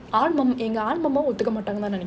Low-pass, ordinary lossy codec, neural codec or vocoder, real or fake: none; none; none; real